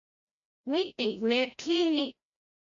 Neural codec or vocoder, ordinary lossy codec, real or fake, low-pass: codec, 16 kHz, 0.5 kbps, FreqCodec, larger model; MP3, 64 kbps; fake; 7.2 kHz